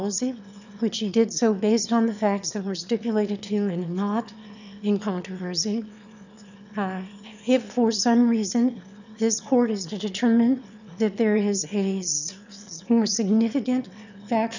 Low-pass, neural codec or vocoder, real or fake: 7.2 kHz; autoencoder, 22.05 kHz, a latent of 192 numbers a frame, VITS, trained on one speaker; fake